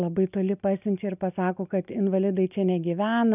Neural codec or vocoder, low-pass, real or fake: none; 3.6 kHz; real